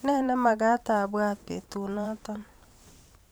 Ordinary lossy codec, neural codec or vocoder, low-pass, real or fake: none; vocoder, 44.1 kHz, 128 mel bands every 512 samples, BigVGAN v2; none; fake